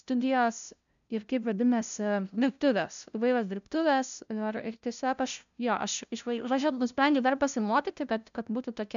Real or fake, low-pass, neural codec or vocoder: fake; 7.2 kHz; codec, 16 kHz, 0.5 kbps, FunCodec, trained on LibriTTS, 25 frames a second